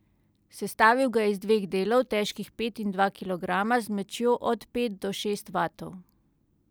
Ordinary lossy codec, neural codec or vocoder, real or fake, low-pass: none; none; real; none